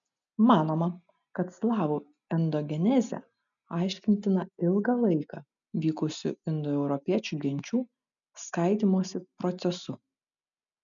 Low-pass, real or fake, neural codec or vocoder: 7.2 kHz; real; none